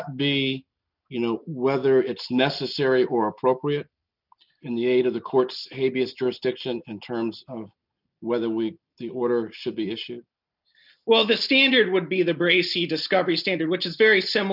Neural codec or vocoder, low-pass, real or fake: none; 5.4 kHz; real